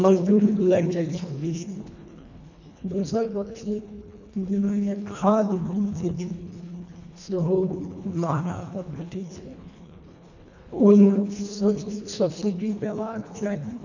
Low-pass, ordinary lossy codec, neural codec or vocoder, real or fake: 7.2 kHz; none; codec, 24 kHz, 1.5 kbps, HILCodec; fake